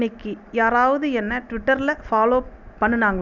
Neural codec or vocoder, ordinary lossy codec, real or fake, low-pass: none; none; real; 7.2 kHz